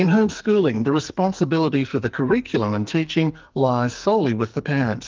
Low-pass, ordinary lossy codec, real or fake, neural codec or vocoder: 7.2 kHz; Opus, 24 kbps; fake; codec, 44.1 kHz, 2.6 kbps, SNAC